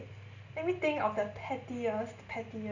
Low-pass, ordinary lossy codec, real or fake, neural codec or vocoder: 7.2 kHz; none; real; none